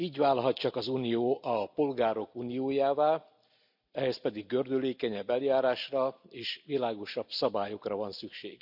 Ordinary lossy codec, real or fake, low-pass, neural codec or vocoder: none; real; 5.4 kHz; none